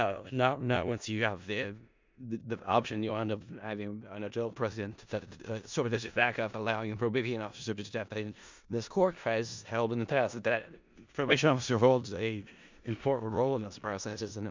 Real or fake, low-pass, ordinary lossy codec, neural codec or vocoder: fake; 7.2 kHz; MP3, 64 kbps; codec, 16 kHz in and 24 kHz out, 0.4 kbps, LongCat-Audio-Codec, four codebook decoder